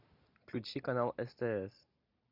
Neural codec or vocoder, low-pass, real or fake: none; 5.4 kHz; real